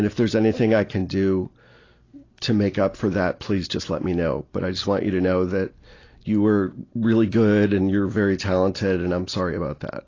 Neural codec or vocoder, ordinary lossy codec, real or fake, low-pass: none; AAC, 32 kbps; real; 7.2 kHz